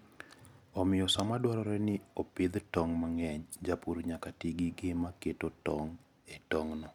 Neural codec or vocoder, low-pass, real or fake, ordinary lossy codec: none; 19.8 kHz; real; none